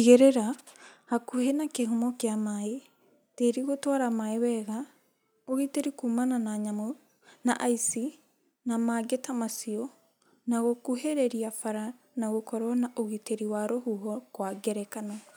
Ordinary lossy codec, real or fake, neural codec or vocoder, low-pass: none; real; none; none